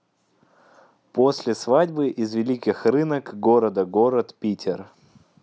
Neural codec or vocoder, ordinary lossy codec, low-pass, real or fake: none; none; none; real